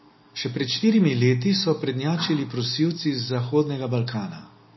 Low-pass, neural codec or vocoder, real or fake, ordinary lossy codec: 7.2 kHz; none; real; MP3, 24 kbps